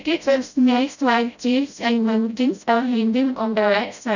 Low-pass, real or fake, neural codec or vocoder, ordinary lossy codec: 7.2 kHz; fake; codec, 16 kHz, 0.5 kbps, FreqCodec, smaller model; none